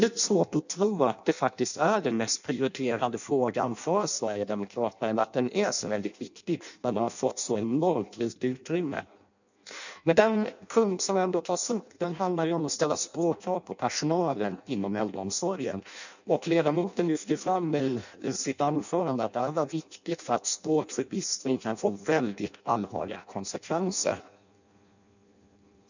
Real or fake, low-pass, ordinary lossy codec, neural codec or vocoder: fake; 7.2 kHz; none; codec, 16 kHz in and 24 kHz out, 0.6 kbps, FireRedTTS-2 codec